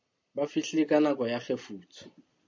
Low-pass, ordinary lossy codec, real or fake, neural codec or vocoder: 7.2 kHz; MP3, 48 kbps; real; none